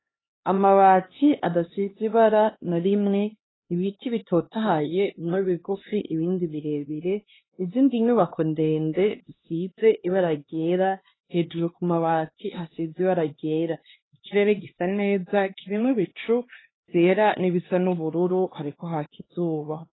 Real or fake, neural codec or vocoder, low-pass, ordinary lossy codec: fake; codec, 16 kHz, 2 kbps, X-Codec, HuBERT features, trained on LibriSpeech; 7.2 kHz; AAC, 16 kbps